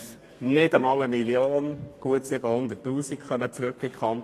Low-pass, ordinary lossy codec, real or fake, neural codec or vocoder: 14.4 kHz; AAC, 48 kbps; fake; codec, 32 kHz, 1.9 kbps, SNAC